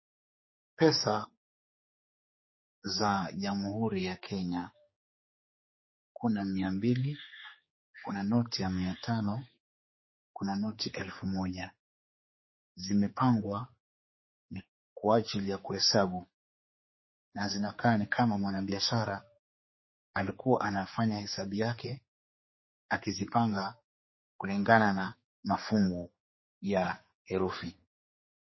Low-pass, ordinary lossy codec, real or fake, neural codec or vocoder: 7.2 kHz; MP3, 24 kbps; fake; codec, 16 kHz, 4 kbps, X-Codec, HuBERT features, trained on general audio